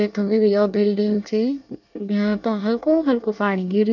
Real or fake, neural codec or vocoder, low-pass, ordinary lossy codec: fake; codec, 24 kHz, 1 kbps, SNAC; 7.2 kHz; none